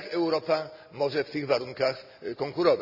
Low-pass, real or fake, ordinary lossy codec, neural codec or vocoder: 5.4 kHz; real; none; none